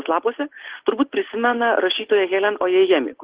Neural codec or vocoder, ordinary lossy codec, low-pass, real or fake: none; Opus, 16 kbps; 3.6 kHz; real